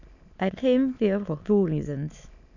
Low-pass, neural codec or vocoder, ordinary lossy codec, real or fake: 7.2 kHz; autoencoder, 22.05 kHz, a latent of 192 numbers a frame, VITS, trained on many speakers; none; fake